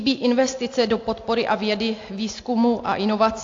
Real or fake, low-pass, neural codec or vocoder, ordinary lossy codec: real; 7.2 kHz; none; AAC, 48 kbps